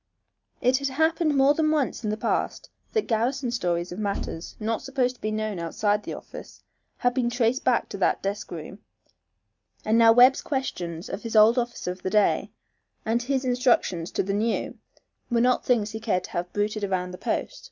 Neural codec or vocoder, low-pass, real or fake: none; 7.2 kHz; real